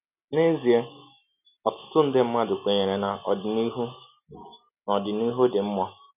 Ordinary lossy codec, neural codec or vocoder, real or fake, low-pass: none; none; real; 3.6 kHz